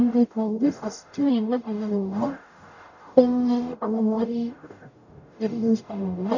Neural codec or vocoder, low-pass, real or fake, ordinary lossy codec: codec, 44.1 kHz, 0.9 kbps, DAC; 7.2 kHz; fake; none